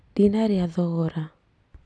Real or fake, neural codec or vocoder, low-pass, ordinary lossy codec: real; none; none; none